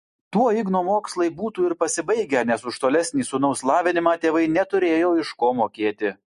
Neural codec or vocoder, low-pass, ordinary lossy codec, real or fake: none; 14.4 kHz; MP3, 48 kbps; real